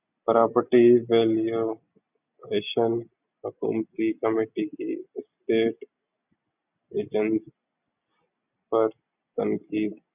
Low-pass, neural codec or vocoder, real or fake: 3.6 kHz; none; real